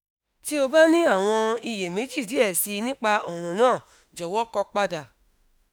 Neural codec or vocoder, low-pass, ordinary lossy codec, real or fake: autoencoder, 48 kHz, 32 numbers a frame, DAC-VAE, trained on Japanese speech; none; none; fake